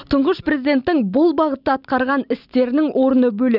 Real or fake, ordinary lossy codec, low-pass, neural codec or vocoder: real; none; 5.4 kHz; none